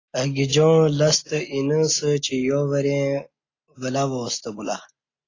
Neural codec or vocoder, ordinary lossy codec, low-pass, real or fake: none; AAC, 32 kbps; 7.2 kHz; real